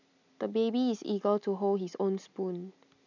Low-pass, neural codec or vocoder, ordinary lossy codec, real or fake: 7.2 kHz; none; none; real